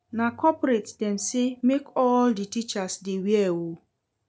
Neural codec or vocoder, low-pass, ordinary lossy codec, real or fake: none; none; none; real